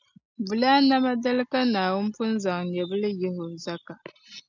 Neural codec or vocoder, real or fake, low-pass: none; real; 7.2 kHz